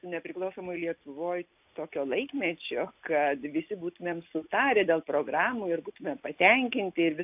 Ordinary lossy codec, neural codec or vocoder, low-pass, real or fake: AAC, 32 kbps; none; 3.6 kHz; real